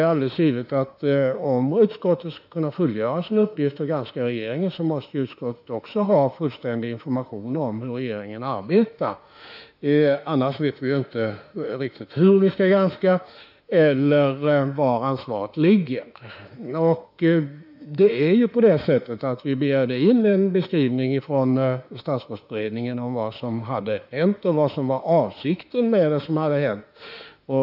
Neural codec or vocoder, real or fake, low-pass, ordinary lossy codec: autoencoder, 48 kHz, 32 numbers a frame, DAC-VAE, trained on Japanese speech; fake; 5.4 kHz; none